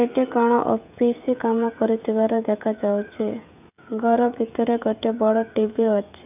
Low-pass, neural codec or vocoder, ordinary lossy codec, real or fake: 3.6 kHz; codec, 16 kHz, 16 kbps, FreqCodec, smaller model; none; fake